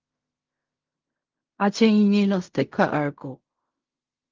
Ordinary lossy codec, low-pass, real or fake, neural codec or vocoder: Opus, 32 kbps; 7.2 kHz; fake; codec, 16 kHz in and 24 kHz out, 0.4 kbps, LongCat-Audio-Codec, fine tuned four codebook decoder